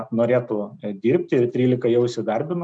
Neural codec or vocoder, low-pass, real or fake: none; 9.9 kHz; real